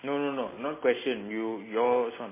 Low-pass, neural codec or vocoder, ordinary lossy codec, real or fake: 3.6 kHz; none; MP3, 16 kbps; real